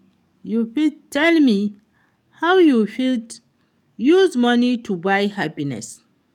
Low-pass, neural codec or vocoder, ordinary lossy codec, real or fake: 19.8 kHz; codec, 44.1 kHz, 7.8 kbps, DAC; none; fake